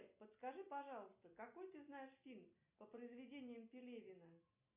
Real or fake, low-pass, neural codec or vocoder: real; 3.6 kHz; none